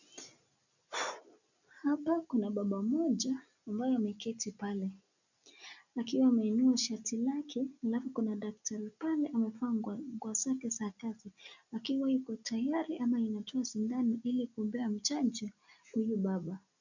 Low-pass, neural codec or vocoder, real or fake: 7.2 kHz; none; real